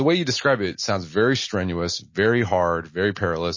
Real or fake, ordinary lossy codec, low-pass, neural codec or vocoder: real; MP3, 32 kbps; 7.2 kHz; none